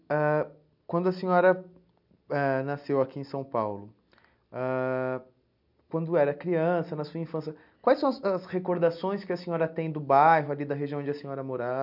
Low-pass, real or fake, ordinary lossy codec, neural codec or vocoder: 5.4 kHz; real; none; none